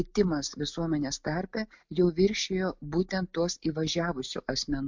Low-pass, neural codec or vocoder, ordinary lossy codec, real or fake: 7.2 kHz; none; MP3, 64 kbps; real